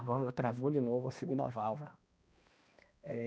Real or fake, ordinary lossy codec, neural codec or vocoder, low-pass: fake; none; codec, 16 kHz, 1 kbps, X-Codec, HuBERT features, trained on balanced general audio; none